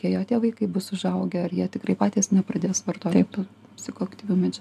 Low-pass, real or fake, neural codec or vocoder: 14.4 kHz; real; none